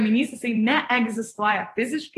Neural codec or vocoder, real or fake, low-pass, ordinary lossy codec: vocoder, 44.1 kHz, 128 mel bands every 512 samples, BigVGAN v2; fake; 14.4 kHz; AAC, 48 kbps